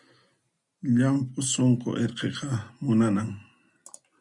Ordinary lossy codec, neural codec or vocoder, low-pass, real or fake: MP3, 96 kbps; none; 10.8 kHz; real